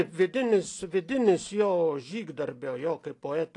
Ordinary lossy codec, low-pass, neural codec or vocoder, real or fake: AAC, 48 kbps; 10.8 kHz; none; real